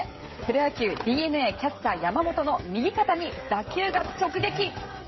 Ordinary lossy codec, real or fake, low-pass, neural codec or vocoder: MP3, 24 kbps; fake; 7.2 kHz; codec, 16 kHz, 16 kbps, FreqCodec, smaller model